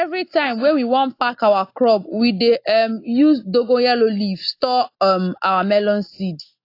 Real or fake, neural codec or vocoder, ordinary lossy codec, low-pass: real; none; AAC, 32 kbps; 5.4 kHz